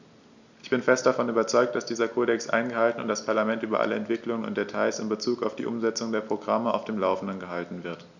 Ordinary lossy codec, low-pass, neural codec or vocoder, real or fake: none; 7.2 kHz; none; real